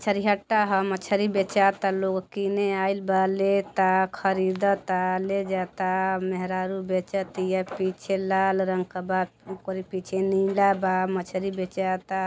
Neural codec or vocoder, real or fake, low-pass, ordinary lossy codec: none; real; none; none